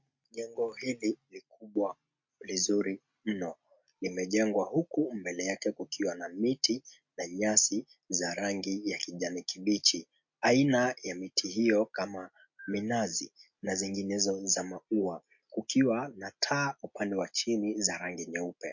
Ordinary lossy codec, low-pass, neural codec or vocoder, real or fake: MP3, 48 kbps; 7.2 kHz; none; real